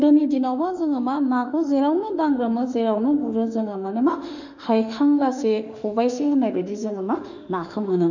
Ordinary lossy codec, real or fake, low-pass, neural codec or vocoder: none; fake; 7.2 kHz; autoencoder, 48 kHz, 32 numbers a frame, DAC-VAE, trained on Japanese speech